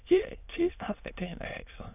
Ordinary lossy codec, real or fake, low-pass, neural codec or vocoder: none; fake; 3.6 kHz; autoencoder, 22.05 kHz, a latent of 192 numbers a frame, VITS, trained on many speakers